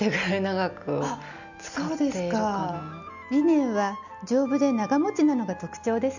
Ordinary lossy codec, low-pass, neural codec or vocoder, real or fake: none; 7.2 kHz; none; real